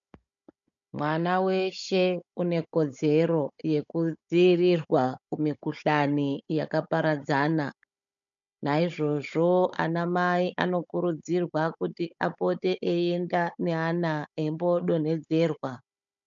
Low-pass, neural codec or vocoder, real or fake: 7.2 kHz; codec, 16 kHz, 16 kbps, FunCodec, trained on Chinese and English, 50 frames a second; fake